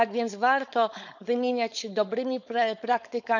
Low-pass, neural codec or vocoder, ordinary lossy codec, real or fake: 7.2 kHz; codec, 16 kHz, 4.8 kbps, FACodec; none; fake